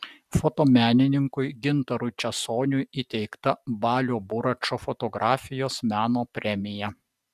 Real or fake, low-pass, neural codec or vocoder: real; 14.4 kHz; none